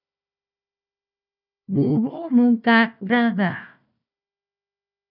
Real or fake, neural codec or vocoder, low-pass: fake; codec, 16 kHz, 1 kbps, FunCodec, trained on Chinese and English, 50 frames a second; 5.4 kHz